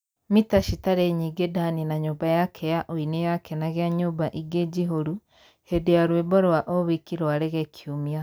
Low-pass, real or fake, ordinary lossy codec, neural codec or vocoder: none; real; none; none